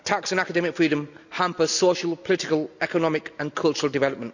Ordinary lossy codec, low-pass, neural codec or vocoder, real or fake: none; 7.2 kHz; none; real